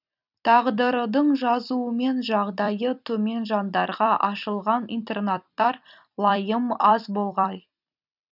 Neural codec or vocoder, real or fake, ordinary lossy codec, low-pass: vocoder, 44.1 kHz, 128 mel bands every 256 samples, BigVGAN v2; fake; none; 5.4 kHz